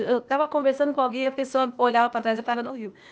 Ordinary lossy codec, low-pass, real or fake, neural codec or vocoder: none; none; fake; codec, 16 kHz, 0.8 kbps, ZipCodec